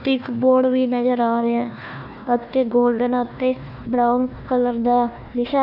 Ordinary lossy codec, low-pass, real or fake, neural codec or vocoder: none; 5.4 kHz; fake; codec, 16 kHz, 1 kbps, FunCodec, trained on Chinese and English, 50 frames a second